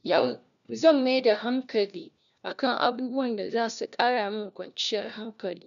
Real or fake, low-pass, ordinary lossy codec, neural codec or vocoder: fake; 7.2 kHz; none; codec, 16 kHz, 1 kbps, FunCodec, trained on LibriTTS, 50 frames a second